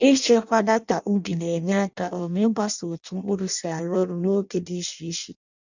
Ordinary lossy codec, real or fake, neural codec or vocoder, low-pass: none; fake; codec, 16 kHz in and 24 kHz out, 0.6 kbps, FireRedTTS-2 codec; 7.2 kHz